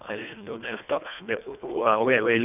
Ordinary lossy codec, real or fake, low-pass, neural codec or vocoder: none; fake; 3.6 kHz; codec, 24 kHz, 1.5 kbps, HILCodec